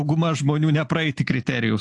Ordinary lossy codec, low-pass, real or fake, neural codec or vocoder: AAC, 64 kbps; 10.8 kHz; real; none